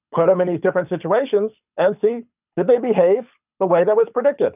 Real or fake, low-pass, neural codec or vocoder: fake; 3.6 kHz; codec, 24 kHz, 6 kbps, HILCodec